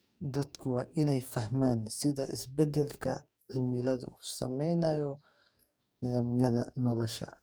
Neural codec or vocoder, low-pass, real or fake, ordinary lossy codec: codec, 44.1 kHz, 2.6 kbps, DAC; none; fake; none